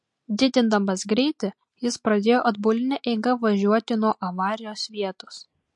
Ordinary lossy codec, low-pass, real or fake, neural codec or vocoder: MP3, 48 kbps; 10.8 kHz; real; none